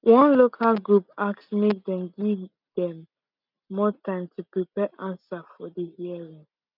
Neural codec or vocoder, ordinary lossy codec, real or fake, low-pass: none; none; real; 5.4 kHz